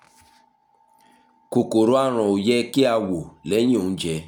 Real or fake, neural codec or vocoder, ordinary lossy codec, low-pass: real; none; none; 19.8 kHz